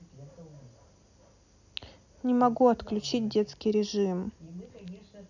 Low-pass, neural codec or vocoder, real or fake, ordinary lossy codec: 7.2 kHz; none; real; none